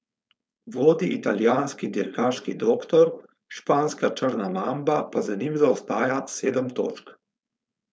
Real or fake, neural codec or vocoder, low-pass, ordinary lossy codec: fake; codec, 16 kHz, 4.8 kbps, FACodec; none; none